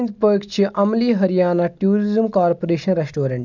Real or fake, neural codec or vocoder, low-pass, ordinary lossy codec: real; none; 7.2 kHz; none